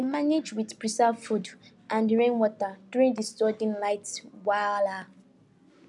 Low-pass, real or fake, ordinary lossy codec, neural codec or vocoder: 10.8 kHz; real; none; none